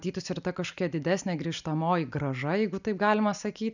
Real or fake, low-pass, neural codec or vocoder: real; 7.2 kHz; none